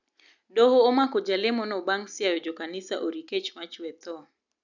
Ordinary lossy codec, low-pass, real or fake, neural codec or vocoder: none; 7.2 kHz; real; none